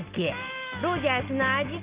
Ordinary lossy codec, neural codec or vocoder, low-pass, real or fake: Opus, 32 kbps; none; 3.6 kHz; real